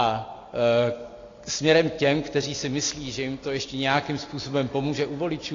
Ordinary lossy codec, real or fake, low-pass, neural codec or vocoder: AAC, 32 kbps; real; 7.2 kHz; none